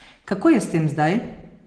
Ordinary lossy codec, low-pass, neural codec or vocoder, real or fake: Opus, 16 kbps; 9.9 kHz; none; real